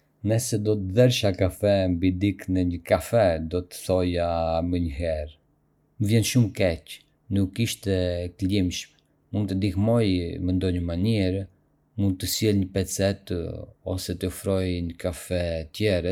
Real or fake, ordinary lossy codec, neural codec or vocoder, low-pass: real; none; none; 19.8 kHz